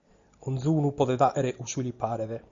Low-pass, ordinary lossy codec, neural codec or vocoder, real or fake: 7.2 kHz; AAC, 64 kbps; none; real